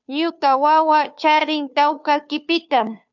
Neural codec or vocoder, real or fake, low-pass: codec, 16 kHz, 2 kbps, FunCodec, trained on Chinese and English, 25 frames a second; fake; 7.2 kHz